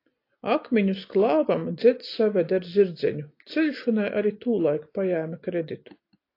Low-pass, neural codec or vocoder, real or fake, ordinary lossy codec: 5.4 kHz; none; real; AAC, 32 kbps